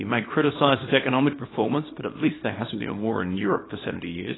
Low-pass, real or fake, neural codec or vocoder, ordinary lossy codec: 7.2 kHz; fake; codec, 24 kHz, 0.9 kbps, WavTokenizer, small release; AAC, 16 kbps